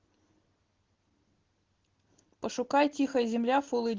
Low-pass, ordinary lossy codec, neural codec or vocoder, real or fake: 7.2 kHz; Opus, 16 kbps; none; real